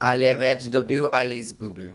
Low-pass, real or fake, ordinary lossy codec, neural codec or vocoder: 10.8 kHz; fake; none; codec, 24 kHz, 1.5 kbps, HILCodec